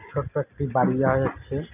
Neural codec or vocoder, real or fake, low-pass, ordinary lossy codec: none; real; 3.6 kHz; none